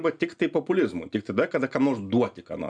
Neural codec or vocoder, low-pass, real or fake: none; 9.9 kHz; real